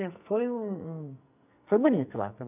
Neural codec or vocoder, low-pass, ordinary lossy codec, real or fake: codec, 44.1 kHz, 2.6 kbps, SNAC; 3.6 kHz; none; fake